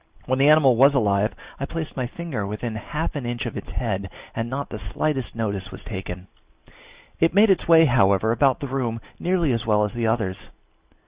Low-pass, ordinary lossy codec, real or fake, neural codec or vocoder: 3.6 kHz; Opus, 64 kbps; real; none